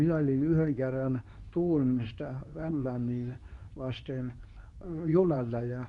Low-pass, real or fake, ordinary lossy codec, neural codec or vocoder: 10.8 kHz; fake; none; codec, 24 kHz, 0.9 kbps, WavTokenizer, medium speech release version 2